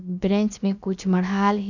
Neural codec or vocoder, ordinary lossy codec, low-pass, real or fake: codec, 16 kHz, about 1 kbps, DyCAST, with the encoder's durations; none; 7.2 kHz; fake